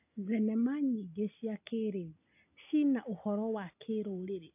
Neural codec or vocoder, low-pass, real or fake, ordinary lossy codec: none; 3.6 kHz; real; AAC, 24 kbps